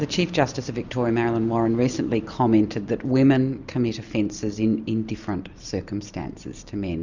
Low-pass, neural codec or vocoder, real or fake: 7.2 kHz; none; real